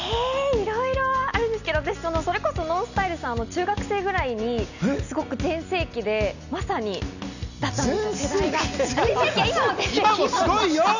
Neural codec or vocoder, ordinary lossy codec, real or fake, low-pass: none; none; real; 7.2 kHz